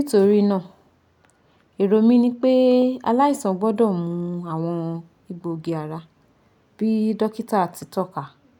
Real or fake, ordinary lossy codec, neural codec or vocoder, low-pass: real; none; none; 19.8 kHz